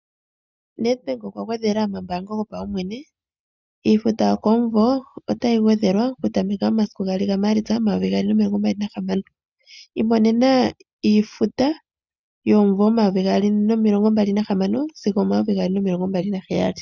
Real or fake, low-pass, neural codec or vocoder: real; 7.2 kHz; none